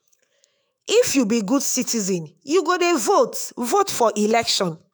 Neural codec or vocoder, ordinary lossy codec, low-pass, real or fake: autoencoder, 48 kHz, 128 numbers a frame, DAC-VAE, trained on Japanese speech; none; none; fake